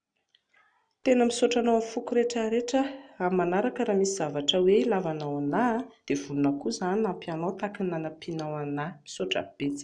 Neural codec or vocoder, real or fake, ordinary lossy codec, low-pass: none; real; none; 9.9 kHz